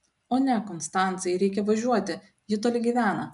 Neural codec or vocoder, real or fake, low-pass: none; real; 10.8 kHz